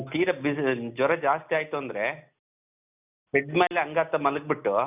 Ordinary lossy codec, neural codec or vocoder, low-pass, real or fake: none; none; 3.6 kHz; real